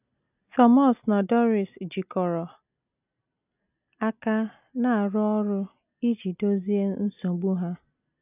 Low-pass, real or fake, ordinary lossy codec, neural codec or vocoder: 3.6 kHz; real; none; none